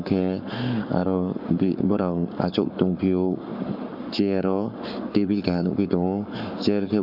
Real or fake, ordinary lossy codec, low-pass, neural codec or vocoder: fake; none; 5.4 kHz; codec, 44.1 kHz, 7.8 kbps, Pupu-Codec